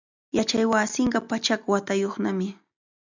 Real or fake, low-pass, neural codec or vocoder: real; 7.2 kHz; none